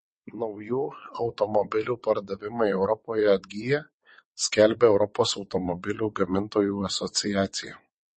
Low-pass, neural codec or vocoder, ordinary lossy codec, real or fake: 9.9 kHz; vocoder, 22.05 kHz, 80 mel bands, WaveNeXt; MP3, 32 kbps; fake